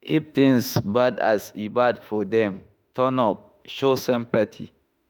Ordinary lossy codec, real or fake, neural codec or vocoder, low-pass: none; fake; autoencoder, 48 kHz, 32 numbers a frame, DAC-VAE, trained on Japanese speech; none